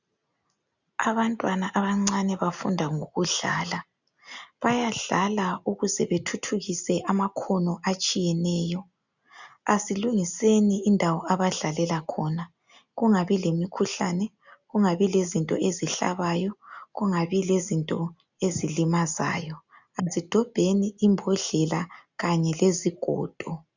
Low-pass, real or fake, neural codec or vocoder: 7.2 kHz; real; none